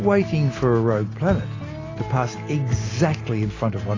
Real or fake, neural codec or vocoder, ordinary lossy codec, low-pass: real; none; AAC, 32 kbps; 7.2 kHz